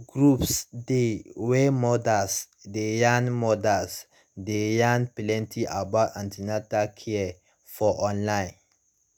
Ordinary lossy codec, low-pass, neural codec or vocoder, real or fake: none; none; none; real